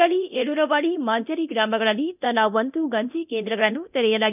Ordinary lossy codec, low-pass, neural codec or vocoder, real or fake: none; 3.6 kHz; codec, 24 kHz, 0.9 kbps, DualCodec; fake